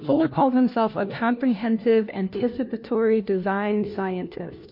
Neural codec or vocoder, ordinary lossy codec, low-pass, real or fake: codec, 16 kHz, 1 kbps, FunCodec, trained on LibriTTS, 50 frames a second; MP3, 32 kbps; 5.4 kHz; fake